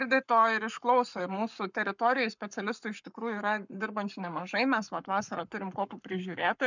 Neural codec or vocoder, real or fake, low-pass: codec, 44.1 kHz, 7.8 kbps, Pupu-Codec; fake; 7.2 kHz